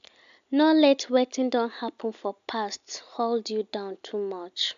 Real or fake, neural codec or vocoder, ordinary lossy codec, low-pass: real; none; AAC, 96 kbps; 7.2 kHz